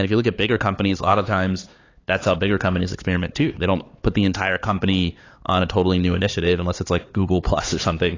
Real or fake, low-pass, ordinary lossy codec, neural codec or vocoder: fake; 7.2 kHz; AAC, 32 kbps; codec, 16 kHz, 4 kbps, X-Codec, HuBERT features, trained on LibriSpeech